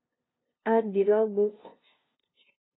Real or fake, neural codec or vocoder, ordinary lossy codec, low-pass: fake; codec, 16 kHz, 0.5 kbps, FunCodec, trained on LibriTTS, 25 frames a second; AAC, 16 kbps; 7.2 kHz